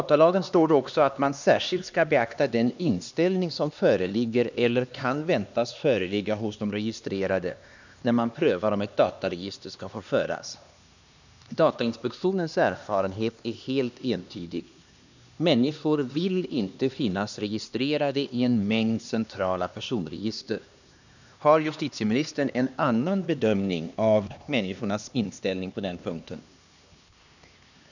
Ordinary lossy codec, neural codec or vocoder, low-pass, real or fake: none; codec, 16 kHz, 2 kbps, X-Codec, HuBERT features, trained on LibriSpeech; 7.2 kHz; fake